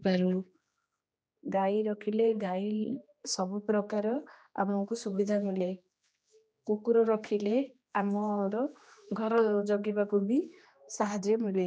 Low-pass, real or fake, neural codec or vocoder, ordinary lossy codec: none; fake; codec, 16 kHz, 2 kbps, X-Codec, HuBERT features, trained on general audio; none